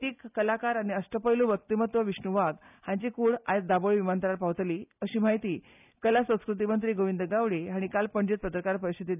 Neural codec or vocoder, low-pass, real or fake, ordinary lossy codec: none; 3.6 kHz; real; none